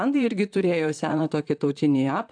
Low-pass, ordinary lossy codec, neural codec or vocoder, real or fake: 9.9 kHz; AAC, 64 kbps; vocoder, 44.1 kHz, 128 mel bands, Pupu-Vocoder; fake